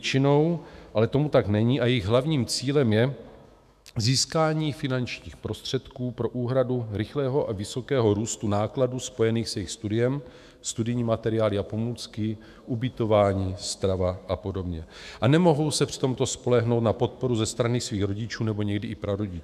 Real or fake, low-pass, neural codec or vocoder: fake; 14.4 kHz; autoencoder, 48 kHz, 128 numbers a frame, DAC-VAE, trained on Japanese speech